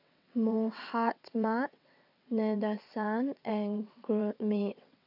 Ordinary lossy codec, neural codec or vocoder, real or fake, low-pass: none; vocoder, 22.05 kHz, 80 mel bands, WaveNeXt; fake; 5.4 kHz